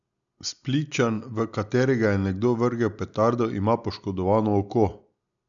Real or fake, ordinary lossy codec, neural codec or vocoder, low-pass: real; none; none; 7.2 kHz